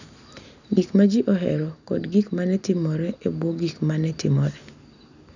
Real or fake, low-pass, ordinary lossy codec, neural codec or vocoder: real; 7.2 kHz; none; none